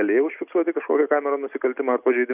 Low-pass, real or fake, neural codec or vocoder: 3.6 kHz; real; none